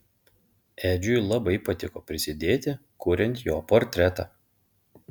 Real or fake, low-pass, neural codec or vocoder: real; 19.8 kHz; none